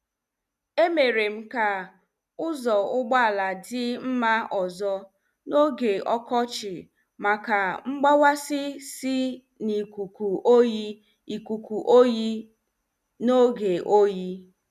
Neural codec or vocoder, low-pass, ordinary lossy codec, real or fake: none; 14.4 kHz; none; real